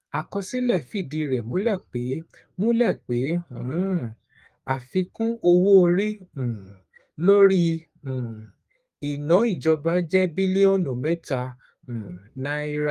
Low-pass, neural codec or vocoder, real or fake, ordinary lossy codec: 14.4 kHz; codec, 32 kHz, 1.9 kbps, SNAC; fake; Opus, 32 kbps